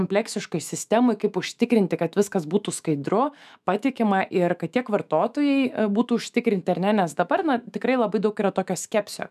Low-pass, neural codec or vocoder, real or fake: 14.4 kHz; autoencoder, 48 kHz, 128 numbers a frame, DAC-VAE, trained on Japanese speech; fake